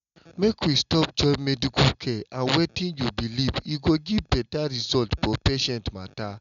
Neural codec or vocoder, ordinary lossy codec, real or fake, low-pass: none; none; real; 7.2 kHz